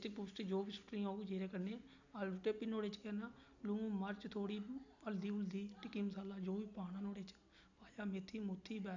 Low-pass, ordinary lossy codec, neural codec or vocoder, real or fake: 7.2 kHz; none; none; real